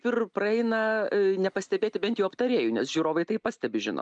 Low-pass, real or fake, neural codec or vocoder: 9.9 kHz; real; none